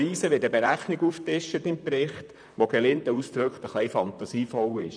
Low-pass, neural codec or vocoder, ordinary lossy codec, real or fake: 9.9 kHz; vocoder, 44.1 kHz, 128 mel bands, Pupu-Vocoder; none; fake